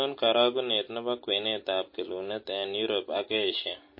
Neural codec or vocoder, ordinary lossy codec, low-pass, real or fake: none; MP3, 24 kbps; 5.4 kHz; real